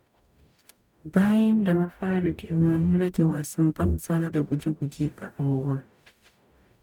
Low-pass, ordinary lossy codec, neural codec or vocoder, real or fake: 19.8 kHz; none; codec, 44.1 kHz, 0.9 kbps, DAC; fake